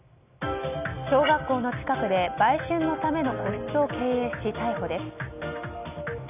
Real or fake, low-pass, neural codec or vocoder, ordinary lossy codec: real; 3.6 kHz; none; none